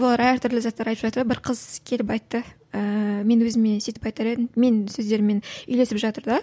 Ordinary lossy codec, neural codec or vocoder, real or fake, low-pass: none; none; real; none